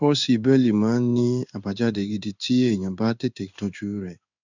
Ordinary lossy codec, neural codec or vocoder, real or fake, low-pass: none; codec, 16 kHz in and 24 kHz out, 1 kbps, XY-Tokenizer; fake; 7.2 kHz